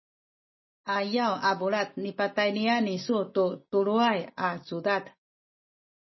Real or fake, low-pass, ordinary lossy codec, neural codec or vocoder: real; 7.2 kHz; MP3, 24 kbps; none